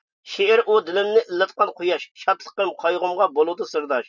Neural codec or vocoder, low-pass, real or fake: none; 7.2 kHz; real